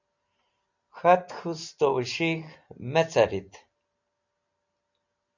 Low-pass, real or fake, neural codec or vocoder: 7.2 kHz; real; none